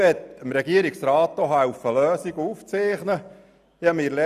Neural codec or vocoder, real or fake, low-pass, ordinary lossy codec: none; real; 14.4 kHz; none